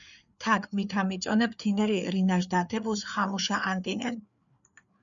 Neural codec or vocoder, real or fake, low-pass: codec, 16 kHz, 4 kbps, FreqCodec, larger model; fake; 7.2 kHz